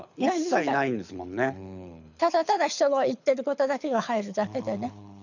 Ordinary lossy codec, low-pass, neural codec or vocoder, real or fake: none; 7.2 kHz; codec, 24 kHz, 6 kbps, HILCodec; fake